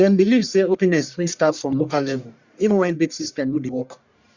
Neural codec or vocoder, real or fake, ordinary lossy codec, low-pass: codec, 44.1 kHz, 1.7 kbps, Pupu-Codec; fake; Opus, 64 kbps; 7.2 kHz